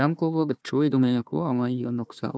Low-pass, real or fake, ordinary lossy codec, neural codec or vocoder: none; fake; none; codec, 16 kHz, 1 kbps, FunCodec, trained on Chinese and English, 50 frames a second